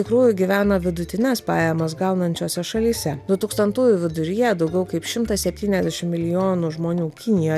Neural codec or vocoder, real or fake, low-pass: none; real; 14.4 kHz